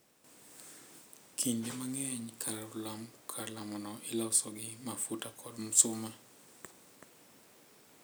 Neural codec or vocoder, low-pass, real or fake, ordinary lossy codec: none; none; real; none